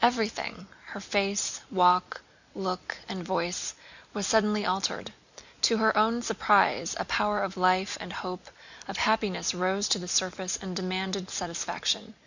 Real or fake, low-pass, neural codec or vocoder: real; 7.2 kHz; none